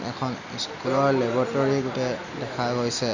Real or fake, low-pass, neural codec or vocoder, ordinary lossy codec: real; 7.2 kHz; none; Opus, 64 kbps